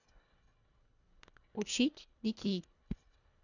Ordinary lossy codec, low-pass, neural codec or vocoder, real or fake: none; 7.2 kHz; codec, 24 kHz, 1.5 kbps, HILCodec; fake